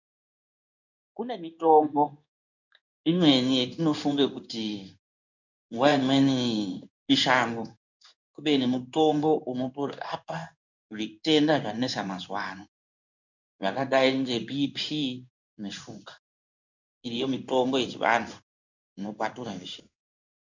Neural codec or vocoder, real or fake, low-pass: codec, 16 kHz in and 24 kHz out, 1 kbps, XY-Tokenizer; fake; 7.2 kHz